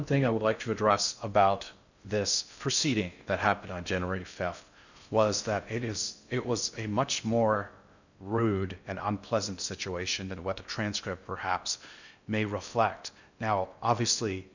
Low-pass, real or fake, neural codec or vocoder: 7.2 kHz; fake; codec, 16 kHz in and 24 kHz out, 0.6 kbps, FocalCodec, streaming, 2048 codes